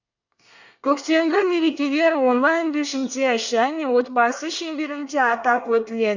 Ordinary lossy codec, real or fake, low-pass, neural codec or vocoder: none; fake; 7.2 kHz; codec, 24 kHz, 1 kbps, SNAC